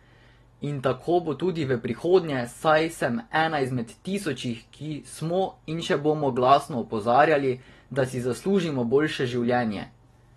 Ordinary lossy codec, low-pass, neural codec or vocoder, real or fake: AAC, 32 kbps; 19.8 kHz; none; real